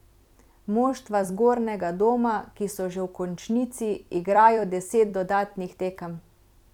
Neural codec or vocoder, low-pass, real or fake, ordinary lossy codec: vocoder, 44.1 kHz, 128 mel bands every 256 samples, BigVGAN v2; 19.8 kHz; fake; none